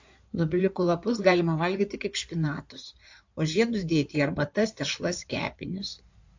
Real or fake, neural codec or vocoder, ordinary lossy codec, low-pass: fake; codec, 16 kHz in and 24 kHz out, 2.2 kbps, FireRedTTS-2 codec; AAC, 48 kbps; 7.2 kHz